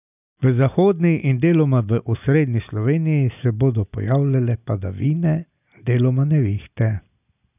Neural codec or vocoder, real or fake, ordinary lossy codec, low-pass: none; real; none; 3.6 kHz